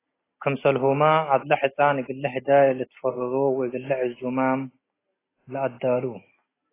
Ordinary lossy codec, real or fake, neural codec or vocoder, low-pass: AAC, 16 kbps; real; none; 3.6 kHz